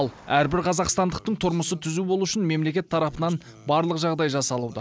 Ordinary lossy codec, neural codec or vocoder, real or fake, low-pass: none; none; real; none